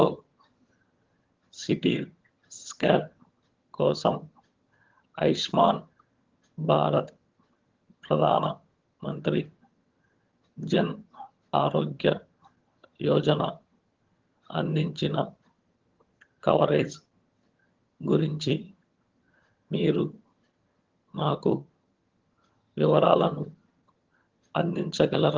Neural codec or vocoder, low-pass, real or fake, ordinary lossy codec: vocoder, 22.05 kHz, 80 mel bands, HiFi-GAN; 7.2 kHz; fake; Opus, 16 kbps